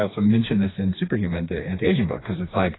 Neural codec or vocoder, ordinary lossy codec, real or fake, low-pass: codec, 16 kHz, 2 kbps, FreqCodec, smaller model; AAC, 16 kbps; fake; 7.2 kHz